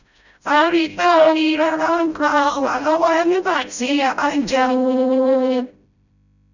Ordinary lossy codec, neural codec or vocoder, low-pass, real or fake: none; codec, 16 kHz, 0.5 kbps, FreqCodec, smaller model; 7.2 kHz; fake